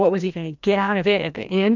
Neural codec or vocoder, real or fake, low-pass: codec, 16 kHz, 1 kbps, FreqCodec, larger model; fake; 7.2 kHz